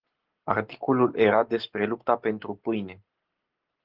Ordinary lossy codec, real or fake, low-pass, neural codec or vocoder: Opus, 16 kbps; real; 5.4 kHz; none